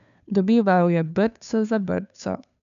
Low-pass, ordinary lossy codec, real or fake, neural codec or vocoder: 7.2 kHz; none; fake; codec, 16 kHz, 2 kbps, FunCodec, trained on Chinese and English, 25 frames a second